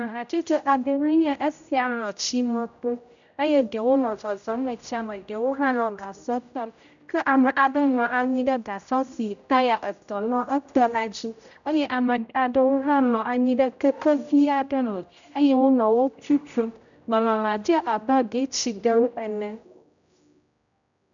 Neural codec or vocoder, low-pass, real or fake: codec, 16 kHz, 0.5 kbps, X-Codec, HuBERT features, trained on general audio; 7.2 kHz; fake